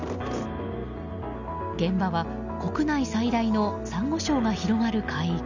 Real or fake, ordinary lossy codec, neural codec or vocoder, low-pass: real; none; none; 7.2 kHz